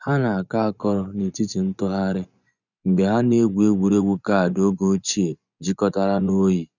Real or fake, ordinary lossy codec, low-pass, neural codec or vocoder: fake; none; 7.2 kHz; vocoder, 24 kHz, 100 mel bands, Vocos